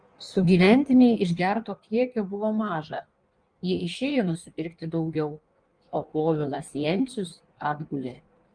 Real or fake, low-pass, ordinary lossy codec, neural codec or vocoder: fake; 9.9 kHz; Opus, 32 kbps; codec, 16 kHz in and 24 kHz out, 1.1 kbps, FireRedTTS-2 codec